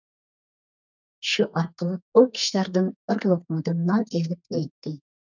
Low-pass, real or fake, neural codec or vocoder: 7.2 kHz; fake; codec, 32 kHz, 1.9 kbps, SNAC